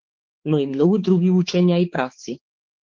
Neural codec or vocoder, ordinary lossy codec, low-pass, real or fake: codec, 16 kHz, 4 kbps, X-Codec, HuBERT features, trained on balanced general audio; Opus, 16 kbps; 7.2 kHz; fake